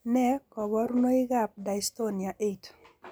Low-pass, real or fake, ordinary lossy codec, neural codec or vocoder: none; real; none; none